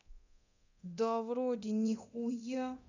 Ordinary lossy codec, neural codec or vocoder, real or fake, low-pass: none; codec, 24 kHz, 0.9 kbps, DualCodec; fake; 7.2 kHz